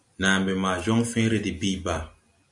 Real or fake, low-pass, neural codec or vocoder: real; 10.8 kHz; none